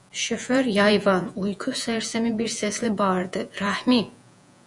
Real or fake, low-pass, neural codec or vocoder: fake; 10.8 kHz; vocoder, 48 kHz, 128 mel bands, Vocos